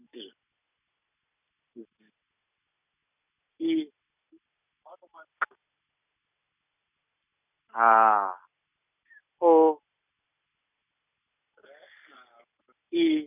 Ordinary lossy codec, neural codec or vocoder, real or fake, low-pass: none; none; real; 3.6 kHz